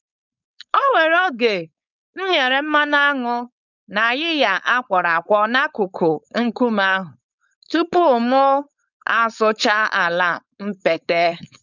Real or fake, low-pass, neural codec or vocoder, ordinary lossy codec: fake; 7.2 kHz; codec, 16 kHz, 4.8 kbps, FACodec; none